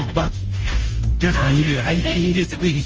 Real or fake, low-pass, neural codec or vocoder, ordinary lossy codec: fake; 7.2 kHz; codec, 16 kHz, 0.5 kbps, FunCodec, trained on Chinese and English, 25 frames a second; Opus, 24 kbps